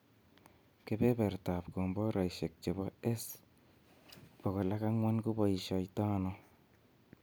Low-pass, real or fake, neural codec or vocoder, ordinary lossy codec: none; real; none; none